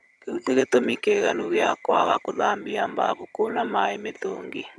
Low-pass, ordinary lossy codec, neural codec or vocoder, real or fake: none; none; vocoder, 22.05 kHz, 80 mel bands, HiFi-GAN; fake